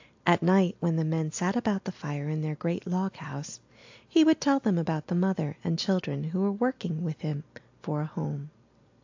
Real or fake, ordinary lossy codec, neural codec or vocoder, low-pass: real; AAC, 48 kbps; none; 7.2 kHz